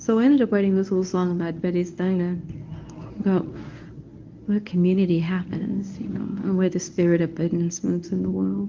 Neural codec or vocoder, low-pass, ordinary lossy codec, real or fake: codec, 24 kHz, 0.9 kbps, WavTokenizer, medium speech release version 1; 7.2 kHz; Opus, 24 kbps; fake